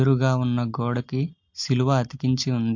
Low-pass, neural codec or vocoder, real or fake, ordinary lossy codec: 7.2 kHz; none; real; MP3, 48 kbps